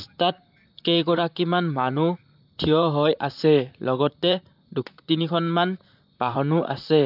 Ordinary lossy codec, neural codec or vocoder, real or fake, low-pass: none; vocoder, 44.1 kHz, 128 mel bands, Pupu-Vocoder; fake; 5.4 kHz